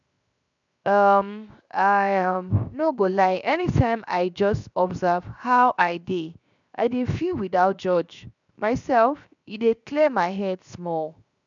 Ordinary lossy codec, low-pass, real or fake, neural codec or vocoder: none; 7.2 kHz; fake; codec, 16 kHz, 0.7 kbps, FocalCodec